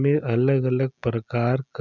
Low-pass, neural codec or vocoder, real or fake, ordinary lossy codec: 7.2 kHz; none; real; none